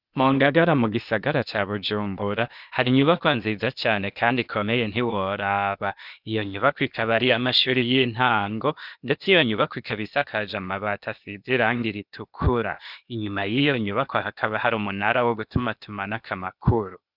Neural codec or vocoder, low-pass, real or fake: codec, 16 kHz, 0.8 kbps, ZipCodec; 5.4 kHz; fake